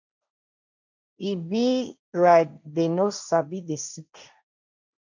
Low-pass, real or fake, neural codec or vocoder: 7.2 kHz; fake; codec, 16 kHz, 1.1 kbps, Voila-Tokenizer